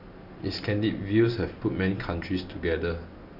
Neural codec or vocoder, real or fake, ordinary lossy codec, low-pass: none; real; none; 5.4 kHz